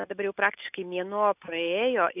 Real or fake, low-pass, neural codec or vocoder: real; 3.6 kHz; none